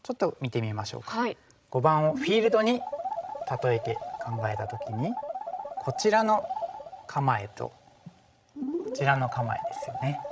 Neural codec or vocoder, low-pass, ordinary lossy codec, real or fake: codec, 16 kHz, 16 kbps, FreqCodec, larger model; none; none; fake